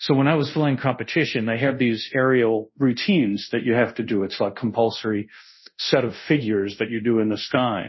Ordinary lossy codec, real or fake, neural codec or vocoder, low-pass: MP3, 24 kbps; fake; codec, 24 kHz, 0.5 kbps, DualCodec; 7.2 kHz